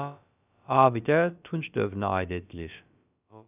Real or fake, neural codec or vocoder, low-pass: fake; codec, 16 kHz, about 1 kbps, DyCAST, with the encoder's durations; 3.6 kHz